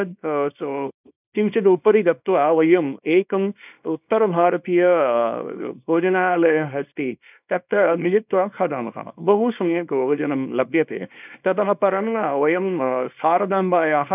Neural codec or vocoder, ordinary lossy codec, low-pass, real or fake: codec, 24 kHz, 0.9 kbps, WavTokenizer, small release; none; 3.6 kHz; fake